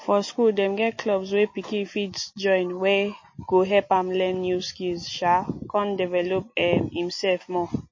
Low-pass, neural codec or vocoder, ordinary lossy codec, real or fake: 7.2 kHz; none; MP3, 32 kbps; real